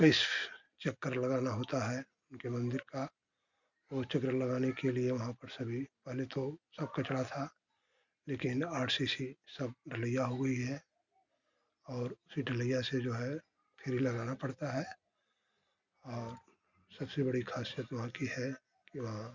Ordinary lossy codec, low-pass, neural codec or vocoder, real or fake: Opus, 64 kbps; 7.2 kHz; none; real